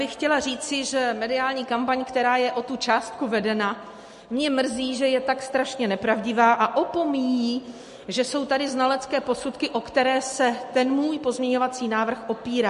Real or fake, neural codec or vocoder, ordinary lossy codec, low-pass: real; none; MP3, 48 kbps; 14.4 kHz